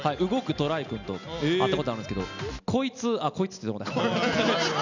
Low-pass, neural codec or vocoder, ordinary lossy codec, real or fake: 7.2 kHz; none; none; real